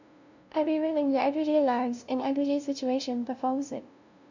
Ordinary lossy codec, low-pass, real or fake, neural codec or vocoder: none; 7.2 kHz; fake; codec, 16 kHz, 0.5 kbps, FunCodec, trained on LibriTTS, 25 frames a second